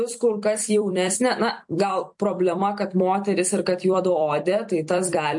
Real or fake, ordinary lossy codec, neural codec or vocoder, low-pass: fake; MP3, 48 kbps; vocoder, 44.1 kHz, 128 mel bands every 256 samples, BigVGAN v2; 10.8 kHz